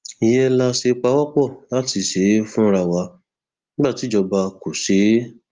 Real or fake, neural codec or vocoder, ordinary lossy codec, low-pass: real; none; Opus, 32 kbps; 7.2 kHz